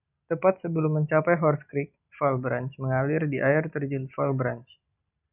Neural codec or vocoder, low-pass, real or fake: none; 3.6 kHz; real